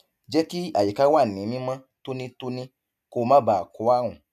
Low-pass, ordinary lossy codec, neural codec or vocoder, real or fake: 14.4 kHz; none; none; real